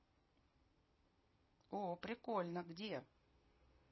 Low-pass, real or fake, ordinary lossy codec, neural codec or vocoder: 7.2 kHz; fake; MP3, 24 kbps; vocoder, 44.1 kHz, 128 mel bands every 256 samples, BigVGAN v2